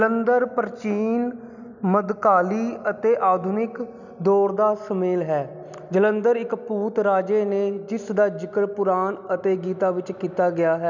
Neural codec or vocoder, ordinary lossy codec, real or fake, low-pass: none; none; real; 7.2 kHz